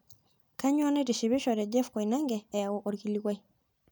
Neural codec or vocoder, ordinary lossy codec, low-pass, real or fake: none; none; none; real